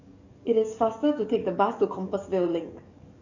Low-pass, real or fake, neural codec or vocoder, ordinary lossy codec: 7.2 kHz; fake; codec, 44.1 kHz, 7.8 kbps, DAC; none